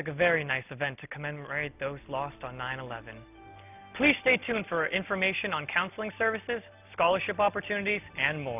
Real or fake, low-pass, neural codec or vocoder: real; 3.6 kHz; none